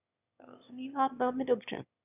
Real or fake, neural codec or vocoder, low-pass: fake; autoencoder, 22.05 kHz, a latent of 192 numbers a frame, VITS, trained on one speaker; 3.6 kHz